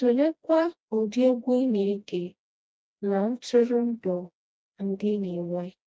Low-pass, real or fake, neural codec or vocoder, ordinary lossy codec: none; fake; codec, 16 kHz, 1 kbps, FreqCodec, smaller model; none